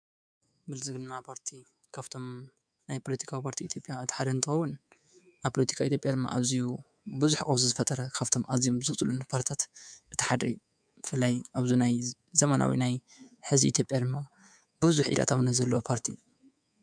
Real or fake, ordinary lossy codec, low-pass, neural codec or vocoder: fake; MP3, 96 kbps; 9.9 kHz; codec, 24 kHz, 3.1 kbps, DualCodec